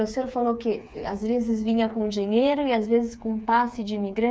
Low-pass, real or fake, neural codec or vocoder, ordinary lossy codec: none; fake; codec, 16 kHz, 4 kbps, FreqCodec, smaller model; none